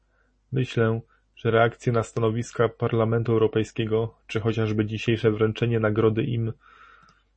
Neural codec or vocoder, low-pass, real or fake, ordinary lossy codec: none; 9.9 kHz; real; MP3, 32 kbps